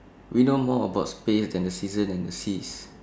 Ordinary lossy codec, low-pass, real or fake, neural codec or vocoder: none; none; real; none